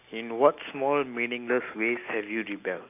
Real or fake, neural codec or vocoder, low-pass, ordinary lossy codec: real; none; 3.6 kHz; none